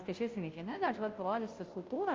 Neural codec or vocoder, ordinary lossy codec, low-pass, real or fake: codec, 16 kHz, 0.5 kbps, FunCodec, trained on Chinese and English, 25 frames a second; Opus, 24 kbps; 7.2 kHz; fake